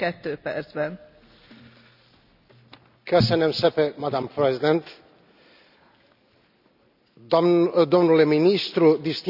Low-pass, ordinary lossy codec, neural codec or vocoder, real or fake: 5.4 kHz; none; none; real